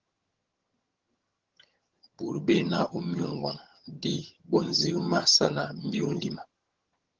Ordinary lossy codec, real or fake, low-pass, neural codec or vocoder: Opus, 16 kbps; fake; 7.2 kHz; vocoder, 22.05 kHz, 80 mel bands, HiFi-GAN